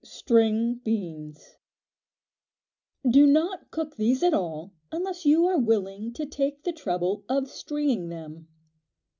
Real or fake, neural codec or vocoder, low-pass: real; none; 7.2 kHz